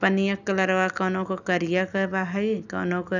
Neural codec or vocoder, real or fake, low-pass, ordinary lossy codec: none; real; 7.2 kHz; none